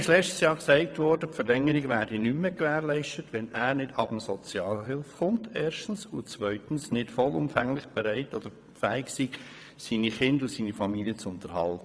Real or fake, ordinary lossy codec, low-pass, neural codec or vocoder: fake; none; none; vocoder, 22.05 kHz, 80 mel bands, WaveNeXt